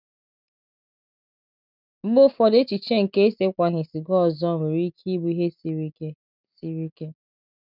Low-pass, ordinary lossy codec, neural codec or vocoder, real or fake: 5.4 kHz; none; none; real